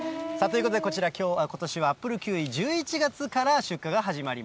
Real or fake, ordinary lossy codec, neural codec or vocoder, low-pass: real; none; none; none